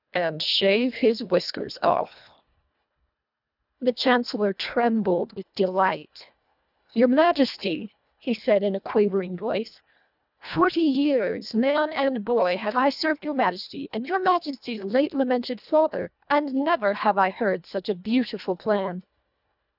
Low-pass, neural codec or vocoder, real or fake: 5.4 kHz; codec, 24 kHz, 1.5 kbps, HILCodec; fake